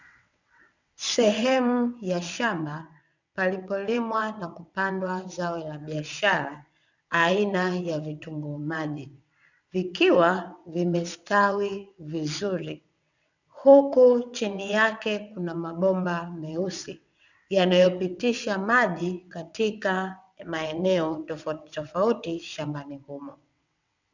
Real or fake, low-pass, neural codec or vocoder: fake; 7.2 kHz; vocoder, 22.05 kHz, 80 mel bands, WaveNeXt